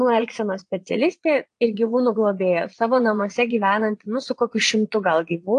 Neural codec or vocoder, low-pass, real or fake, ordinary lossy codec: vocoder, 22.05 kHz, 80 mel bands, Vocos; 9.9 kHz; fake; AAC, 48 kbps